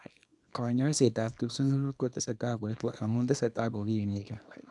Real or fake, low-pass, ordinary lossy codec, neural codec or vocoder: fake; 10.8 kHz; none; codec, 24 kHz, 0.9 kbps, WavTokenizer, small release